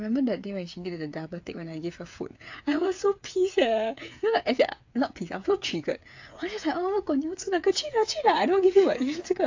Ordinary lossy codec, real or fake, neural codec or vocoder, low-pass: AAC, 48 kbps; fake; codec, 16 kHz, 8 kbps, FreqCodec, smaller model; 7.2 kHz